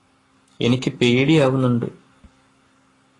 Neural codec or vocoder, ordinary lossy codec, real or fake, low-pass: codec, 44.1 kHz, 7.8 kbps, Pupu-Codec; AAC, 32 kbps; fake; 10.8 kHz